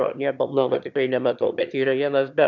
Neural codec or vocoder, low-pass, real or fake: autoencoder, 22.05 kHz, a latent of 192 numbers a frame, VITS, trained on one speaker; 7.2 kHz; fake